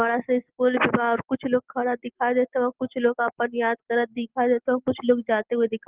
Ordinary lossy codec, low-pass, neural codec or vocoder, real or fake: Opus, 16 kbps; 3.6 kHz; none; real